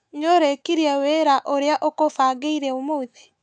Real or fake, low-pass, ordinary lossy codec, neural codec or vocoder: fake; 9.9 kHz; none; autoencoder, 48 kHz, 128 numbers a frame, DAC-VAE, trained on Japanese speech